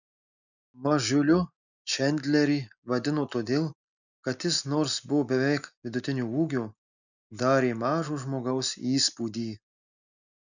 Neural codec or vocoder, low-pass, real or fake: none; 7.2 kHz; real